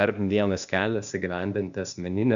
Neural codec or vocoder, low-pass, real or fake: codec, 16 kHz, 0.8 kbps, ZipCodec; 7.2 kHz; fake